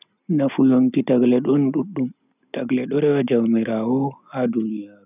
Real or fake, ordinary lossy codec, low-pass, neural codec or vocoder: real; none; 3.6 kHz; none